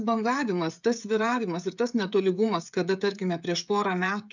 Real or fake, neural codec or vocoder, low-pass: fake; codec, 16 kHz, 16 kbps, FreqCodec, smaller model; 7.2 kHz